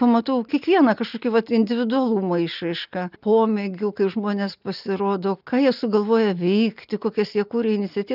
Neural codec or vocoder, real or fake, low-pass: none; real; 5.4 kHz